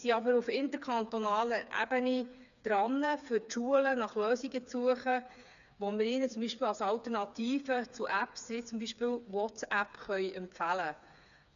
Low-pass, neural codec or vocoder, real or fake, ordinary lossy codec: 7.2 kHz; codec, 16 kHz, 4 kbps, FreqCodec, smaller model; fake; none